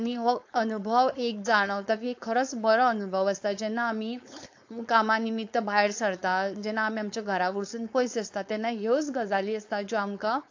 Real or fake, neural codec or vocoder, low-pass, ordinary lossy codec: fake; codec, 16 kHz, 4.8 kbps, FACodec; 7.2 kHz; AAC, 48 kbps